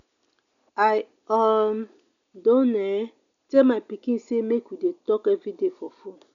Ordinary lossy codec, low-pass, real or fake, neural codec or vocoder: none; 7.2 kHz; real; none